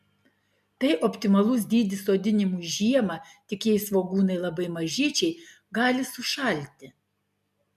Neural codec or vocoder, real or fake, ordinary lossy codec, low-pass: none; real; MP3, 96 kbps; 14.4 kHz